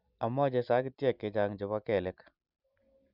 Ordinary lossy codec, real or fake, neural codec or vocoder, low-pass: none; real; none; 5.4 kHz